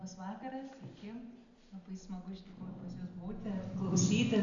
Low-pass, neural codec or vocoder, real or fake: 7.2 kHz; none; real